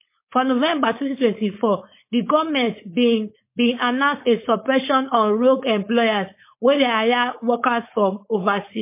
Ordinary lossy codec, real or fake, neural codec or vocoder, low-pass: MP3, 24 kbps; fake; codec, 16 kHz, 4.8 kbps, FACodec; 3.6 kHz